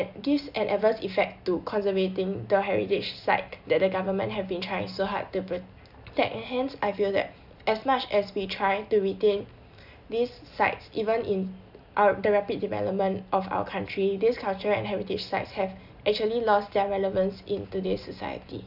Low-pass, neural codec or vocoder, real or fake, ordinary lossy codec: 5.4 kHz; none; real; none